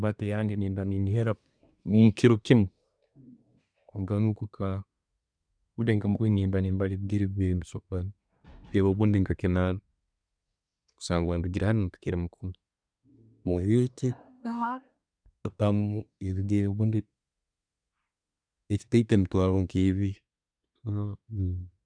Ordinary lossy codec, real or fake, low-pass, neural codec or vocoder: none; fake; 9.9 kHz; codec, 24 kHz, 1 kbps, SNAC